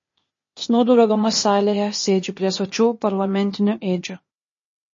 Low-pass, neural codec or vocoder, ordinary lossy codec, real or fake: 7.2 kHz; codec, 16 kHz, 0.8 kbps, ZipCodec; MP3, 32 kbps; fake